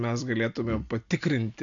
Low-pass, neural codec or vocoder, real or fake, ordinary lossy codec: 7.2 kHz; none; real; MP3, 64 kbps